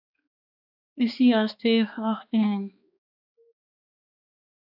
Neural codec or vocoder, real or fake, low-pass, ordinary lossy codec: codec, 16 kHz, 2 kbps, X-Codec, HuBERT features, trained on balanced general audio; fake; 5.4 kHz; AAC, 48 kbps